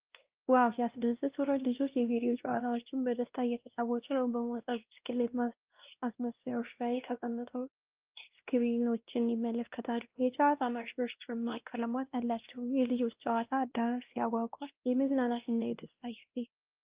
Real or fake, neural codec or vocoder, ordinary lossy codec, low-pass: fake; codec, 16 kHz, 1 kbps, X-Codec, WavLM features, trained on Multilingual LibriSpeech; Opus, 32 kbps; 3.6 kHz